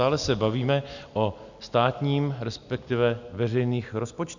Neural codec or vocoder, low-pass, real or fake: none; 7.2 kHz; real